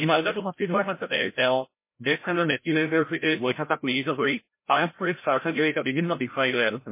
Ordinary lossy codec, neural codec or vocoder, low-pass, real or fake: MP3, 24 kbps; codec, 16 kHz, 0.5 kbps, FreqCodec, larger model; 3.6 kHz; fake